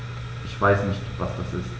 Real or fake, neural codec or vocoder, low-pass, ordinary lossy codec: real; none; none; none